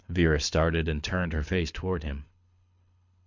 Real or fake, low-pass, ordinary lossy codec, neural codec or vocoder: fake; 7.2 kHz; MP3, 64 kbps; codec, 24 kHz, 6 kbps, HILCodec